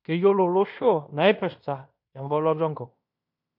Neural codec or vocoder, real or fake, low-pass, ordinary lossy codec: codec, 16 kHz in and 24 kHz out, 0.9 kbps, LongCat-Audio-Codec, fine tuned four codebook decoder; fake; 5.4 kHz; none